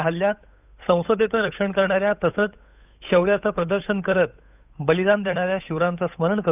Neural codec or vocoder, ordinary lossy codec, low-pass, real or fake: codec, 16 kHz, 8 kbps, FunCodec, trained on Chinese and English, 25 frames a second; none; 3.6 kHz; fake